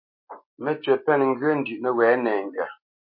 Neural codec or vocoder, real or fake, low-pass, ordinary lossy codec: none; real; 5.4 kHz; MP3, 32 kbps